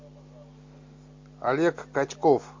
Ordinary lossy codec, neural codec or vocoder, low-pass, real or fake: MP3, 48 kbps; none; 7.2 kHz; real